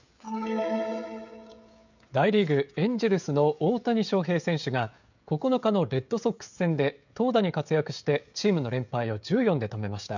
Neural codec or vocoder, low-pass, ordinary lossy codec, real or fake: codec, 16 kHz, 16 kbps, FreqCodec, smaller model; 7.2 kHz; none; fake